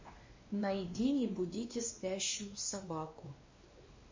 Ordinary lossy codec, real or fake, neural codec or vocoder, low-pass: MP3, 32 kbps; fake; codec, 16 kHz, 2 kbps, X-Codec, WavLM features, trained on Multilingual LibriSpeech; 7.2 kHz